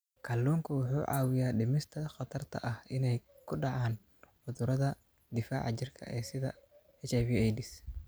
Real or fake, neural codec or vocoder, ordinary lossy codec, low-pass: real; none; none; none